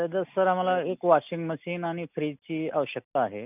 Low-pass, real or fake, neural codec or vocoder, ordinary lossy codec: 3.6 kHz; real; none; none